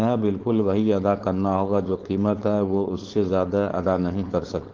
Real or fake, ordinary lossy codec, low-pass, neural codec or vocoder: fake; Opus, 16 kbps; 7.2 kHz; codec, 16 kHz, 4.8 kbps, FACodec